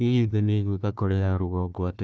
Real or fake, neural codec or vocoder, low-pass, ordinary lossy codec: fake; codec, 16 kHz, 1 kbps, FunCodec, trained on Chinese and English, 50 frames a second; none; none